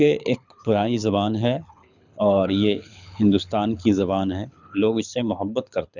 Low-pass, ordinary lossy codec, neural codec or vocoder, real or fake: 7.2 kHz; none; codec, 24 kHz, 6 kbps, HILCodec; fake